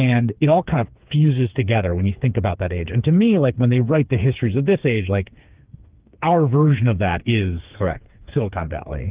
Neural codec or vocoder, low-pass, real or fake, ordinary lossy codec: codec, 16 kHz, 4 kbps, FreqCodec, smaller model; 3.6 kHz; fake; Opus, 32 kbps